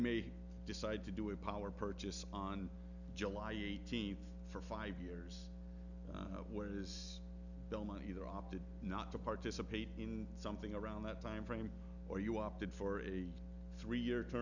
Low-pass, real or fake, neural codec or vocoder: 7.2 kHz; real; none